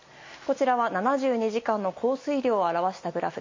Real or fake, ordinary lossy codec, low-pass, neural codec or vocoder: real; MP3, 32 kbps; 7.2 kHz; none